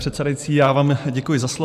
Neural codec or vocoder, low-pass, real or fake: vocoder, 44.1 kHz, 128 mel bands every 512 samples, BigVGAN v2; 14.4 kHz; fake